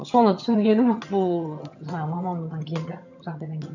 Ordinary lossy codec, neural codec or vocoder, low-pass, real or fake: none; vocoder, 22.05 kHz, 80 mel bands, HiFi-GAN; 7.2 kHz; fake